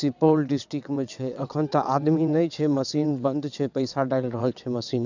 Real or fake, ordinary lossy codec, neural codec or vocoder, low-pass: fake; none; vocoder, 22.05 kHz, 80 mel bands, WaveNeXt; 7.2 kHz